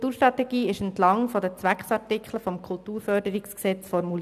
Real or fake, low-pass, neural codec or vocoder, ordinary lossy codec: real; 14.4 kHz; none; none